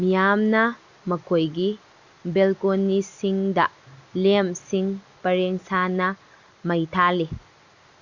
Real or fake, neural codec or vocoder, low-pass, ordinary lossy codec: real; none; 7.2 kHz; Opus, 64 kbps